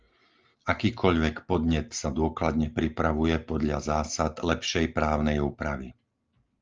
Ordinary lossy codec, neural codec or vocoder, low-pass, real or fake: Opus, 32 kbps; none; 7.2 kHz; real